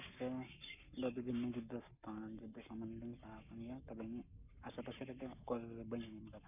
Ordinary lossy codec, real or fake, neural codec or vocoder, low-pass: none; fake; codec, 44.1 kHz, 7.8 kbps, Pupu-Codec; 3.6 kHz